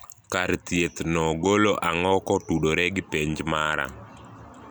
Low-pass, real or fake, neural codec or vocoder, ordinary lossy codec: none; real; none; none